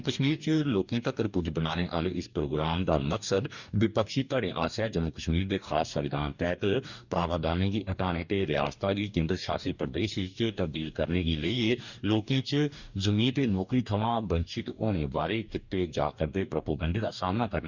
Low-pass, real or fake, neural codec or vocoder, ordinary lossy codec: 7.2 kHz; fake; codec, 44.1 kHz, 2.6 kbps, DAC; none